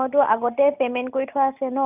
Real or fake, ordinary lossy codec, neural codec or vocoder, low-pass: real; none; none; 3.6 kHz